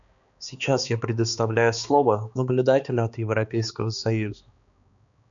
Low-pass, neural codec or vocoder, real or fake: 7.2 kHz; codec, 16 kHz, 2 kbps, X-Codec, HuBERT features, trained on balanced general audio; fake